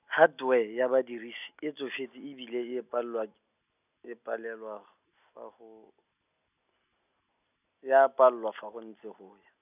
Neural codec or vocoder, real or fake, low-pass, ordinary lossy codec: none; real; 3.6 kHz; none